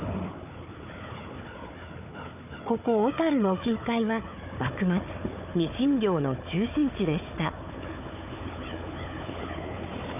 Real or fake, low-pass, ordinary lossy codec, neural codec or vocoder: fake; 3.6 kHz; none; codec, 16 kHz, 4 kbps, FunCodec, trained on Chinese and English, 50 frames a second